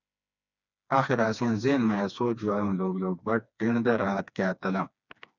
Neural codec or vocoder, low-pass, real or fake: codec, 16 kHz, 2 kbps, FreqCodec, smaller model; 7.2 kHz; fake